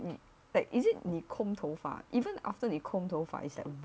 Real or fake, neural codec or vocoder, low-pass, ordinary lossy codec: real; none; none; none